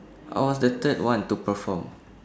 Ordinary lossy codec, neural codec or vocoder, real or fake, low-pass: none; none; real; none